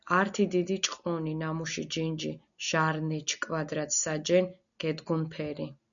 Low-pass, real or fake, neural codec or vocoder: 7.2 kHz; real; none